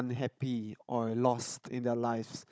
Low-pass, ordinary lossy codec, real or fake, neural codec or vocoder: none; none; fake; codec, 16 kHz, 16 kbps, FunCodec, trained on Chinese and English, 50 frames a second